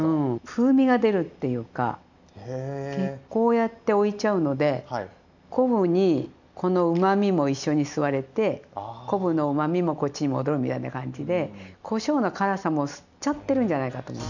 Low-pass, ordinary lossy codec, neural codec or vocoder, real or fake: 7.2 kHz; none; none; real